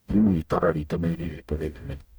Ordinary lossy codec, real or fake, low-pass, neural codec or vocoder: none; fake; none; codec, 44.1 kHz, 0.9 kbps, DAC